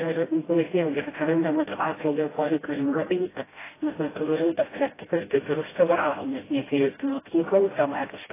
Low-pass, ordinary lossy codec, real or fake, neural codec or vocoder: 3.6 kHz; AAC, 16 kbps; fake; codec, 16 kHz, 0.5 kbps, FreqCodec, smaller model